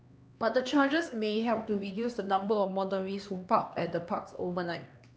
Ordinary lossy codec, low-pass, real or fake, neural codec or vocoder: none; none; fake; codec, 16 kHz, 2 kbps, X-Codec, HuBERT features, trained on LibriSpeech